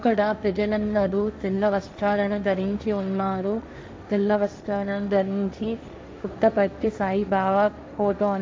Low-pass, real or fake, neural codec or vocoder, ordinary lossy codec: none; fake; codec, 16 kHz, 1.1 kbps, Voila-Tokenizer; none